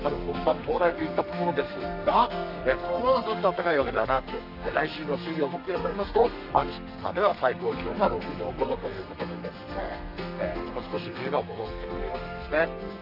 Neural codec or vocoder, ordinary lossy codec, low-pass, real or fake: codec, 32 kHz, 1.9 kbps, SNAC; none; 5.4 kHz; fake